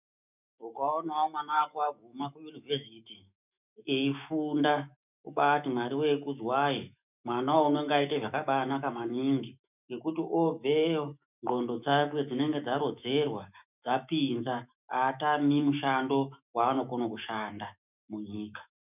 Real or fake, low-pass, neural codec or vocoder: fake; 3.6 kHz; autoencoder, 48 kHz, 128 numbers a frame, DAC-VAE, trained on Japanese speech